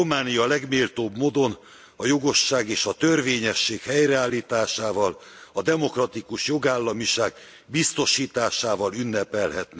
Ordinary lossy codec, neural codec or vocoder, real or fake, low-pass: none; none; real; none